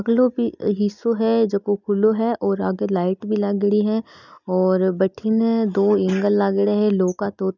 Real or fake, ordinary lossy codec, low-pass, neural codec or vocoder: real; none; 7.2 kHz; none